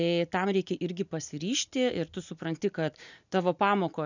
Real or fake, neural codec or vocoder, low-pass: real; none; 7.2 kHz